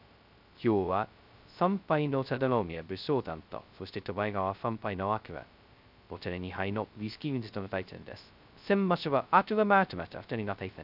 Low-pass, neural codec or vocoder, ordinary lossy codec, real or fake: 5.4 kHz; codec, 16 kHz, 0.2 kbps, FocalCodec; none; fake